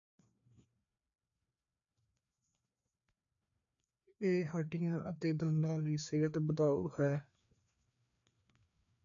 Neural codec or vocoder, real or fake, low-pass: codec, 16 kHz, 2 kbps, FreqCodec, larger model; fake; 7.2 kHz